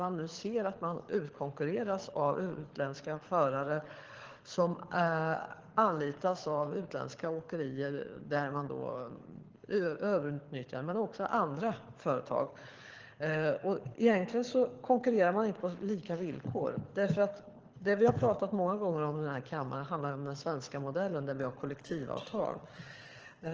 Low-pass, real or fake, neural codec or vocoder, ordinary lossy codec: 7.2 kHz; fake; codec, 24 kHz, 6 kbps, HILCodec; Opus, 16 kbps